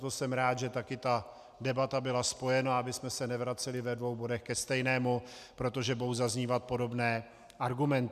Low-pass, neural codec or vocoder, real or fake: 14.4 kHz; none; real